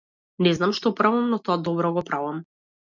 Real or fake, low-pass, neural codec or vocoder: real; 7.2 kHz; none